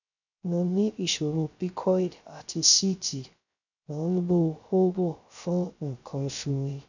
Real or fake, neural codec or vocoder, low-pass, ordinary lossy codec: fake; codec, 16 kHz, 0.3 kbps, FocalCodec; 7.2 kHz; none